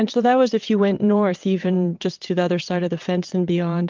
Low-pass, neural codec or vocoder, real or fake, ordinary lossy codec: 7.2 kHz; vocoder, 22.05 kHz, 80 mel bands, WaveNeXt; fake; Opus, 24 kbps